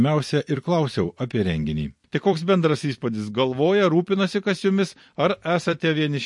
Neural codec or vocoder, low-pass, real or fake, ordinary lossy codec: vocoder, 44.1 kHz, 128 mel bands every 256 samples, BigVGAN v2; 10.8 kHz; fake; MP3, 48 kbps